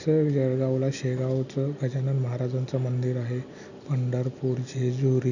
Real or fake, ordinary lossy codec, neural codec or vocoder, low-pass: real; none; none; 7.2 kHz